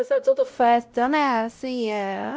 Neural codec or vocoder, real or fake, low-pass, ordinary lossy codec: codec, 16 kHz, 0.5 kbps, X-Codec, WavLM features, trained on Multilingual LibriSpeech; fake; none; none